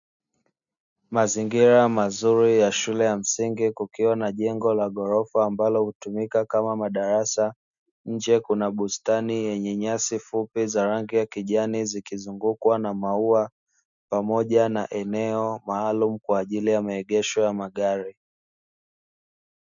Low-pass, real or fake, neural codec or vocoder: 7.2 kHz; real; none